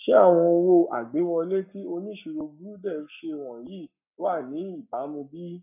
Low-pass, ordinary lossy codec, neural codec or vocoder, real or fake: 3.6 kHz; none; codec, 44.1 kHz, 7.8 kbps, Pupu-Codec; fake